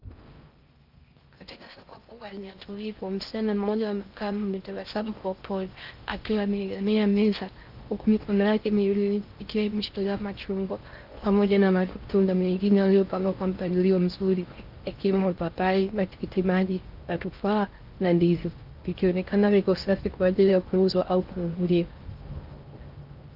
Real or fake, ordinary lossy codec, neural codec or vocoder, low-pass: fake; Opus, 24 kbps; codec, 16 kHz in and 24 kHz out, 0.6 kbps, FocalCodec, streaming, 4096 codes; 5.4 kHz